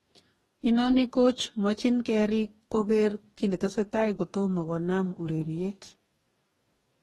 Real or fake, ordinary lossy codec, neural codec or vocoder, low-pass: fake; AAC, 32 kbps; codec, 44.1 kHz, 2.6 kbps, DAC; 19.8 kHz